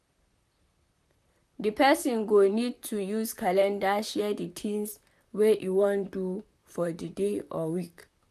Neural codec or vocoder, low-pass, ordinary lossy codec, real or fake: vocoder, 44.1 kHz, 128 mel bands, Pupu-Vocoder; 14.4 kHz; none; fake